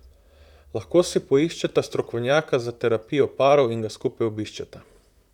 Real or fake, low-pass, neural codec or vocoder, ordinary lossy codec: fake; 19.8 kHz; vocoder, 44.1 kHz, 128 mel bands, Pupu-Vocoder; none